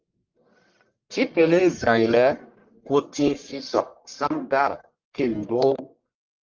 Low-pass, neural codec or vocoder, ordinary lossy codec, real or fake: 7.2 kHz; codec, 44.1 kHz, 1.7 kbps, Pupu-Codec; Opus, 24 kbps; fake